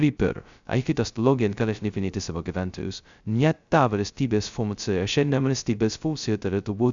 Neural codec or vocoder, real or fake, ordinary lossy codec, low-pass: codec, 16 kHz, 0.2 kbps, FocalCodec; fake; Opus, 64 kbps; 7.2 kHz